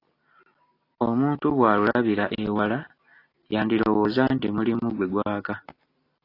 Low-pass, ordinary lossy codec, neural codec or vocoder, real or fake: 5.4 kHz; AAC, 32 kbps; none; real